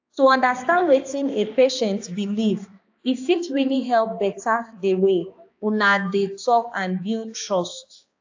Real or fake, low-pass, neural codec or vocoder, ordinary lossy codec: fake; 7.2 kHz; codec, 16 kHz, 2 kbps, X-Codec, HuBERT features, trained on balanced general audio; AAC, 48 kbps